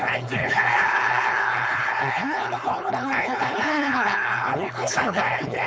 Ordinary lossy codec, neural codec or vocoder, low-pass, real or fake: none; codec, 16 kHz, 4.8 kbps, FACodec; none; fake